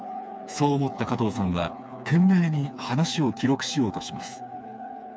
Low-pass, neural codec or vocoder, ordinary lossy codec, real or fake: none; codec, 16 kHz, 4 kbps, FreqCodec, smaller model; none; fake